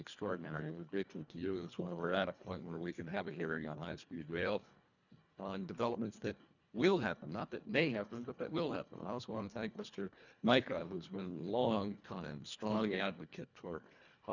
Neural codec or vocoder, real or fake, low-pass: codec, 24 kHz, 1.5 kbps, HILCodec; fake; 7.2 kHz